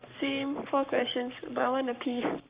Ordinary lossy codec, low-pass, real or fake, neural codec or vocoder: Opus, 64 kbps; 3.6 kHz; fake; codec, 44.1 kHz, 7.8 kbps, Pupu-Codec